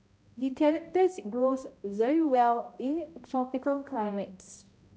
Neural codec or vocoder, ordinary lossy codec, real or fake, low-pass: codec, 16 kHz, 0.5 kbps, X-Codec, HuBERT features, trained on balanced general audio; none; fake; none